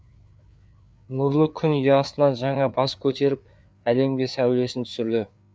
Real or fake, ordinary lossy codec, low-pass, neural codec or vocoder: fake; none; none; codec, 16 kHz, 4 kbps, FreqCodec, larger model